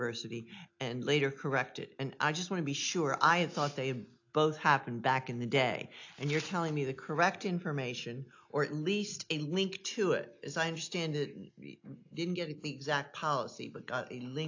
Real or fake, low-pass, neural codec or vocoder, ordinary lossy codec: real; 7.2 kHz; none; AAC, 48 kbps